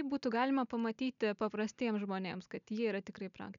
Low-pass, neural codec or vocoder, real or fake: 7.2 kHz; none; real